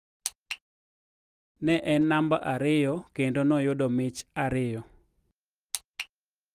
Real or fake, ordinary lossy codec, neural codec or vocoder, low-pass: real; Opus, 32 kbps; none; 14.4 kHz